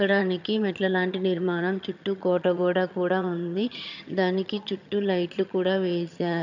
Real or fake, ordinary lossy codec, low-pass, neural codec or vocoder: fake; none; 7.2 kHz; vocoder, 22.05 kHz, 80 mel bands, HiFi-GAN